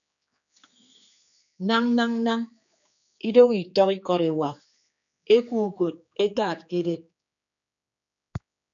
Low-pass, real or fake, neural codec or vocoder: 7.2 kHz; fake; codec, 16 kHz, 4 kbps, X-Codec, HuBERT features, trained on general audio